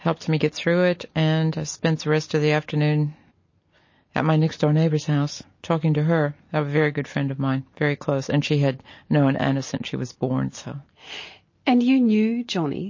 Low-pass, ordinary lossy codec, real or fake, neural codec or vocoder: 7.2 kHz; MP3, 32 kbps; real; none